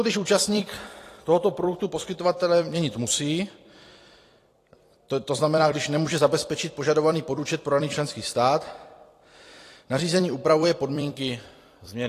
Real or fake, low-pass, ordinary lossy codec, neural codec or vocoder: fake; 14.4 kHz; AAC, 48 kbps; vocoder, 44.1 kHz, 128 mel bands every 256 samples, BigVGAN v2